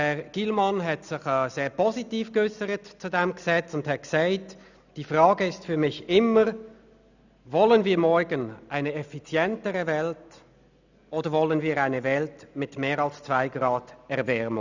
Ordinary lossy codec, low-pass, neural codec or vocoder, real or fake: none; 7.2 kHz; none; real